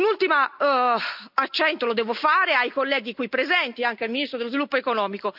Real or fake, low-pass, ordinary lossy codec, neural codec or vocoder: real; 5.4 kHz; none; none